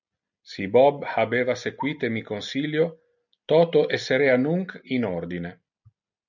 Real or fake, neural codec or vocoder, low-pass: real; none; 7.2 kHz